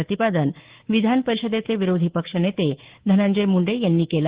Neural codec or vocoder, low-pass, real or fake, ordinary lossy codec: codec, 24 kHz, 3.1 kbps, DualCodec; 3.6 kHz; fake; Opus, 16 kbps